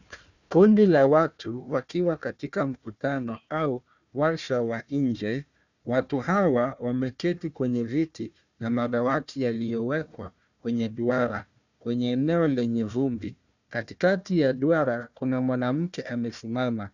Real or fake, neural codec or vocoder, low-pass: fake; codec, 16 kHz, 1 kbps, FunCodec, trained on Chinese and English, 50 frames a second; 7.2 kHz